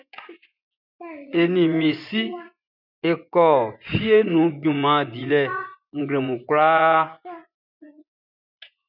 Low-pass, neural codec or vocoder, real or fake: 5.4 kHz; vocoder, 24 kHz, 100 mel bands, Vocos; fake